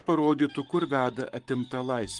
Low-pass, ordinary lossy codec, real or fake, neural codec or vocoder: 10.8 kHz; Opus, 24 kbps; fake; codec, 44.1 kHz, 7.8 kbps, Pupu-Codec